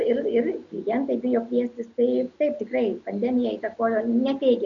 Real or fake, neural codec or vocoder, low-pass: real; none; 7.2 kHz